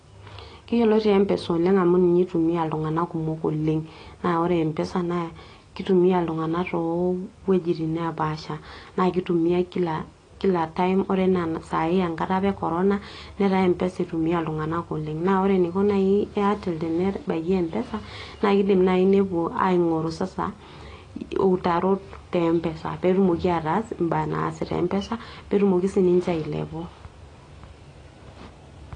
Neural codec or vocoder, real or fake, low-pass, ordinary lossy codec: none; real; 9.9 kHz; AAC, 32 kbps